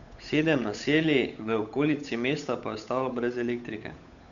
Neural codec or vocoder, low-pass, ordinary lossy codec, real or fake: codec, 16 kHz, 8 kbps, FunCodec, trained on Chinese and English, 25 frames a second; 7.2 kHz; none; fake